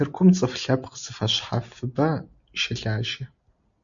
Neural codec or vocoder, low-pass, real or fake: none; 7.2 kHz; real